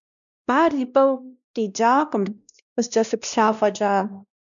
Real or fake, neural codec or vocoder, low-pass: fake; codec, 16 kHz, 1 kbps, X-Codec, WavLM features, trained on Multilingual LibriSpeech; 7.2 kHz